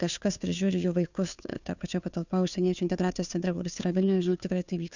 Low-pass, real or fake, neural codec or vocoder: 7.2 kHz; fake; codec, 16 kHz, 2 kbps, FunCodec, trained on Chinese and English, 25 frames a second